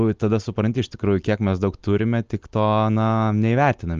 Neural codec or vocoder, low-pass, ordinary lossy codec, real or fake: none; 7.2 kHz; Opus, 24 kbps; real